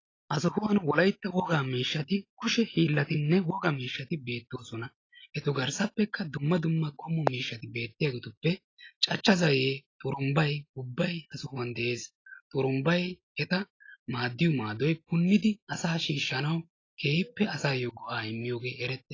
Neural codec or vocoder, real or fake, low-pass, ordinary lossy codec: none; real; 7.2 kHz; AAC, 32 kbps